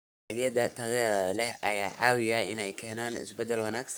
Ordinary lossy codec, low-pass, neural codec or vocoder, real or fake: none; none; codec, 44.1 kHz, 3.4 kbps, Pupu-Codec; fake